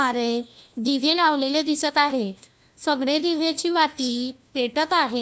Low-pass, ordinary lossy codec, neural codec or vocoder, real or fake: none; none; codec, 16 kHz, 1 kbps, FunCodec, trained on LibriTTS, 50 frames a second; fake